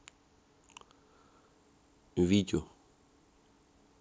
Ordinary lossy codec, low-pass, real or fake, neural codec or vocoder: none; none; real; none